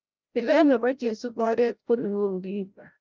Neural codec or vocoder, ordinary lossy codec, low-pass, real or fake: codec, 16 kHz, 0.5 kbps, FreqCodec, larger model; Opus, 24 kbps; 7.2 kHz; fake